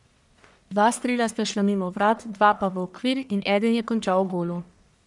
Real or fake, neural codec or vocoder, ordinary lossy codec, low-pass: fake; codec, 44.1 kHz, 1.7 kbps, Pupu-Codec; none; 10.8 kHz